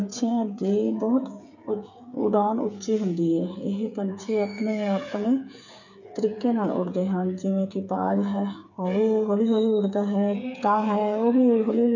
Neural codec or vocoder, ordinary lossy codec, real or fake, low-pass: codec, 16 kHz, 8 kbps, FreqCodec, smaller model; none; fake; 7.2 kHz